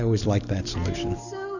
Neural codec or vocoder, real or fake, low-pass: none; real; 7.2 kHz